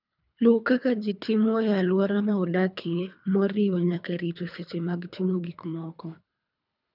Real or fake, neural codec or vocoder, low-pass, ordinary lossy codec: fake; codec, 24 kHz, 3 kbps, HILCodec; 5.4 kHz; none